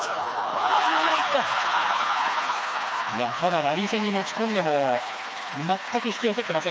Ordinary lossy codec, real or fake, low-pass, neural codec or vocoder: none; fake; none; codec, 16 kHz, 2 kbps, FreqCodec, smaller model